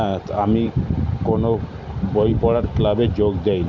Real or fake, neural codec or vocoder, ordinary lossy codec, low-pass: real; none; none; 7.2 kHz